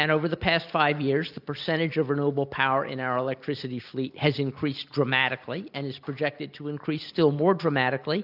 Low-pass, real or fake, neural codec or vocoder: 5.4 kHz; real; none